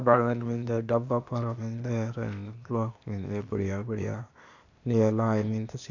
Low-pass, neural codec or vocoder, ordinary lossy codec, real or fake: 7.2 kHz; codec, 16 kHz, 0.8 kbps, ZipCodec; none; fake